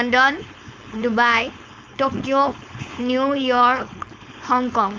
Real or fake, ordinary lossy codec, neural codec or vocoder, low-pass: fake; none; codec, 16 kHz, 4.8 kbps, FACodec; none